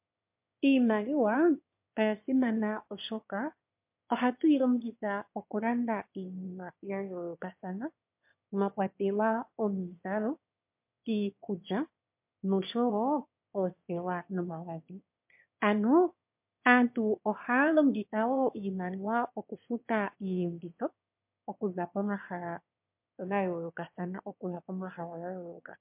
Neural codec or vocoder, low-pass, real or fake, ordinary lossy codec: autoencoder, 22.05 kHz, a latent of 192 numbers a frame, VITS, trained on one speaker; 3.6 kHz; fake; MP3, 32 kbps